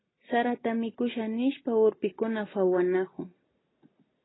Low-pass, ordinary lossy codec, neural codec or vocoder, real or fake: 7.2 kHz; AAC, 16 kbps; none; real